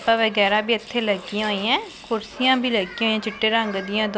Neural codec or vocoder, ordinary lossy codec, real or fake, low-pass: none; none; real; none